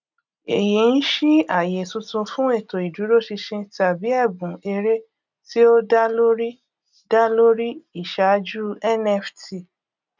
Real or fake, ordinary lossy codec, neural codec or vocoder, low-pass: real; none; none; 7.2 kHz